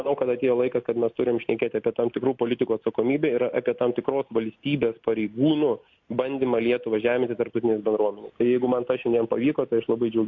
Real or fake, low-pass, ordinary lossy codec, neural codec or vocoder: real; 7.2 kHz; MP3, 48 kbps; none